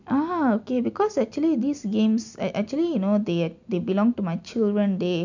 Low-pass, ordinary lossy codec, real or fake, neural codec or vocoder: 7.2 kHz; none; real; none